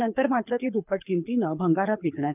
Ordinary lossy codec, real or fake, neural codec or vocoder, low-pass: none; fake; codec, 24 kHz, 6 kbps, HILCodec; 3.6 kHz